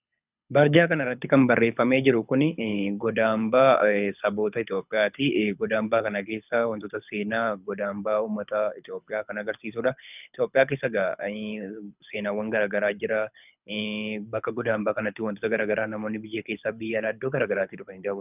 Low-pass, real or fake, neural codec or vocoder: 3.6 kHz; fake; codec, 24 kHz, 6 kbps, HILCodec